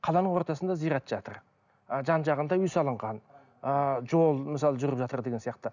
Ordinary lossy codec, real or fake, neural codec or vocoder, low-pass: none; real; none; 7.2 kHz